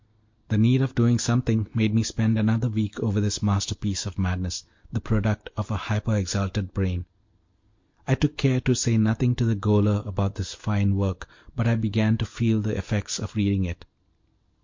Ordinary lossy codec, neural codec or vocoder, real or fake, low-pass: MP3, 48 kbps; none; real; 7.2 kHz